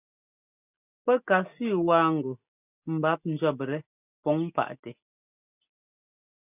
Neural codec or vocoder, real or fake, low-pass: none; real; 3.6 kHz